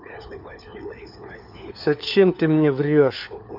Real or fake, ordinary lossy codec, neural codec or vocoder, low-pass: fake; none; codec, 16 kHz, 4 kbps, FunCodec, trained on LibriTTS, 50 frames a second; 5.4 kHz